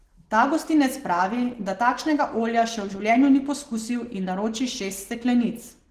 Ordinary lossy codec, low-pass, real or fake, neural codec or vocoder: Opus, 16 kbps; 14.4 kHz; real; none